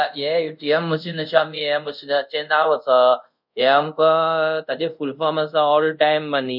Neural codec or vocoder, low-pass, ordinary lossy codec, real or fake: codec, 24 kHz, 0.5 kbps, DualCodec; 5.4 kHz; none; fake